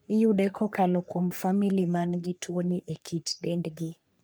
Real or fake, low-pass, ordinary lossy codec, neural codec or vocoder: fake; none; none; codec, 44.1 kHz, 3.4 kbps, Pupu-Codec